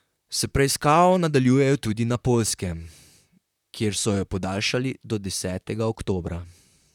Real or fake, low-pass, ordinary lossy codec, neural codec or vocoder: fake; 19.8 kHz; none; vocoder, 44.1 kHz, 128 mel bands, Pupu-Vocoder